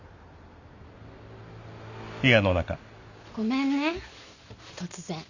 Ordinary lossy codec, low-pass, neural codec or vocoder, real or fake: none; 7.2 kHz; none; real